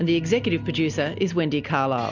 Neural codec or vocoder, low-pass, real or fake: none; 7.2 kHz; real